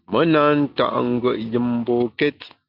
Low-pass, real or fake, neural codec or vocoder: 5.4 kHz; real; none